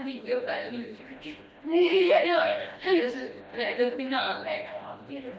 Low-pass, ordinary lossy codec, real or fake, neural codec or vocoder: none; none; fake; codec, 16 kHz, 1 kbps, FreqCodec, smaller model